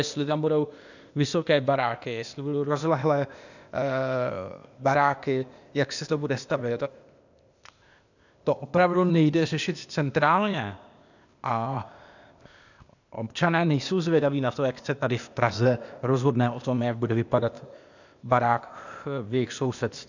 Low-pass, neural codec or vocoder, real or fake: 7.2 kHz; codec, 16 kHz, 0.8 kbps, ZipCodec; fake